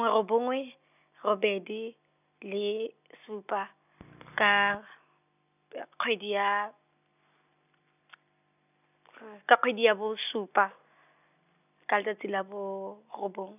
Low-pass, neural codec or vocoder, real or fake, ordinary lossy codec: 3.6 kHz; none; real; none